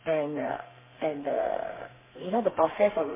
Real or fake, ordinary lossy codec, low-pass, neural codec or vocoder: fake; MP3, 16 kbps; 3.6 kHz; codec, 32 kHz, 1.9 kbps, SNAC